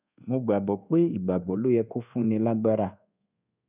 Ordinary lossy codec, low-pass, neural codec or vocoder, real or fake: none; 3.6 kHz; codec, 24 kHz, 1.2 kbps, DualCodec; fake